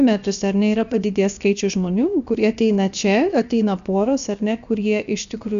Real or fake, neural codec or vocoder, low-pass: fake; codec, 16 kHz, about 1 kbps, DyCAST, with the encoder's durations; 7.2 kHz